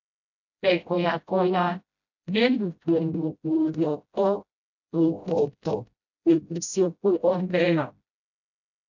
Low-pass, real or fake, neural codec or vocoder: 7.2 kHz; fake; codec, 16 kHz, 0.5 kbps, FreqCodec, smaller model